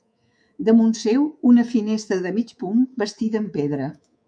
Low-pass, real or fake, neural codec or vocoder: 9.9 kHz; fake; codec, 24 kHz, 3.1 kbps, DualCodec